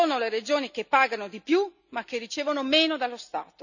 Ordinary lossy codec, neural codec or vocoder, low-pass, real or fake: none; none; 7.2 kHz; real